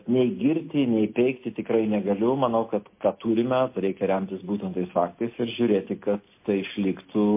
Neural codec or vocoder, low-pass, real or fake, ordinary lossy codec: none; 3.6 kHz; real; MP3, 24 kbps